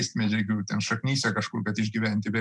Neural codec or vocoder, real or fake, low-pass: none; real; 10.8 kHz